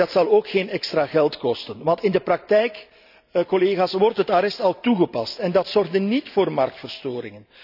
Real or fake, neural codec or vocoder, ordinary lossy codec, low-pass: real; none; none; 5.4 kHz